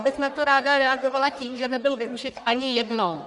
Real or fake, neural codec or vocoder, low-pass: fake; codec, 44.1 kHz, 1.7 kbps, Pupu-Codec; 10.8 kHz